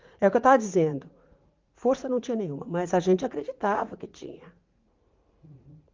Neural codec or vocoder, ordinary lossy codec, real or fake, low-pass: vocoder, 44.1 kHz, 80 mel bands, Vocos; Opus, 32 kbps; fake; 7.2 kHz